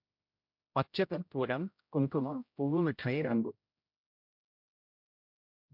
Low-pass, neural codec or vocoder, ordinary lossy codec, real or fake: 5.4 kHz; codec, 16 kHz, 0.5 kbps, X-Codec, HuBERT features, trained on general audio; MP3, 48 kbps; fake